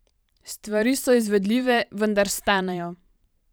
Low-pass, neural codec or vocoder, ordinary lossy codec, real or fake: none; vocoder, 44.1 kHz, 128 mel bands every 512 samples, BigVGAN v2; none; fake